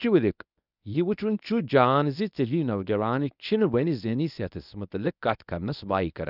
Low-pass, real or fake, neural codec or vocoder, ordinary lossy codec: 5.4 kHz; fake; codec, 24 kHz, 0.9 kbps, WavTokenizer, medium speech release version 1; none